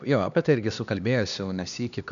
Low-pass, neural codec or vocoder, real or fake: 7.2 kHz; codec, 16 kHz, 2 kbps, X-Codec, HuBERT features, trained on LibriSpeech; fake